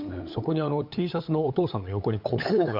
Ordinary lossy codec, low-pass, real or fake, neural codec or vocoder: none; 5.4 kHz; fake; codec, 16 kHz, 8 kbps, FunCodec, trained on LibriTTS, 25 frames a second